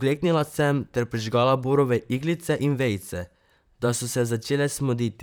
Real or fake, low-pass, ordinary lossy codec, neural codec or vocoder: fake; none; none; vocoder, 44.1 kHz, 128 mel bands, Pupu-Vocoder